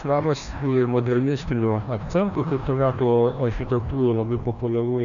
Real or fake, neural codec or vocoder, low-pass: fake; codec, 16 kHz, 1 kbps, FreqCodec, larger model; 7.2 kHz